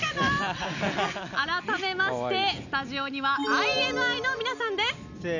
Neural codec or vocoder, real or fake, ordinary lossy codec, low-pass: none; real; none; 7.2 kHz